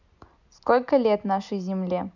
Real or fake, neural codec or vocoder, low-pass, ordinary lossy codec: real; none; 7.2 kHz; none